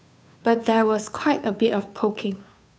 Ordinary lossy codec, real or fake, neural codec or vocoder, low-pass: none; fake; codec, 16 kHz, 2 kbps, FunCodec, trained on Chinese and English, 25 frames a second; none